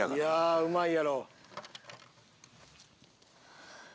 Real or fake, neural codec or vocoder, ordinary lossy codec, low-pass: real; none; none; none